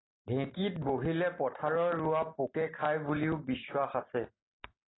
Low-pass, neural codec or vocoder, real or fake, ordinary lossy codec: 7.2 kHz; none; real; AAC, 16 kbps